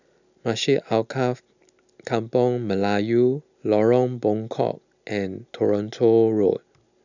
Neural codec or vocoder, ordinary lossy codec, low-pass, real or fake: none; none; 7.2 kHz; real